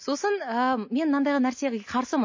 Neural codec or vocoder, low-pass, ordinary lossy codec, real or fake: none; 7.2 kHz; MP3, 32 kbps; real